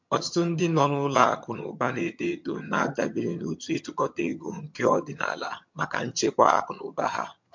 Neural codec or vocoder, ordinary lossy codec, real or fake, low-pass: vocoder, 22.05 kHz, 80 mel bands, HiFi-GAN; MP3, 48 kbps; fake; 7.2 kHz